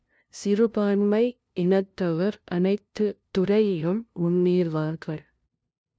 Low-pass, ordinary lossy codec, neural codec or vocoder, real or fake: none; none; codec, 16 kHz, 0.5 kbps, FunCodec, trained on LibriTTS, 25 frames a second; fake